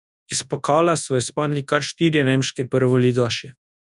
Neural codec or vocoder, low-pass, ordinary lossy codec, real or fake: codec, 24 kHz, 0.9 kbps, WavTokenizer, large speech release; 10.8 kHz; none; fake